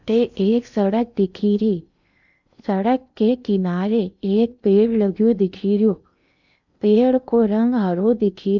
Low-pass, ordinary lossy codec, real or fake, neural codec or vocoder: 7.2 kHz; none; fake; codec, 16 kHz in and 24 kHz out, 0.8 kbps, FocalCodec, streaming, 65536 codes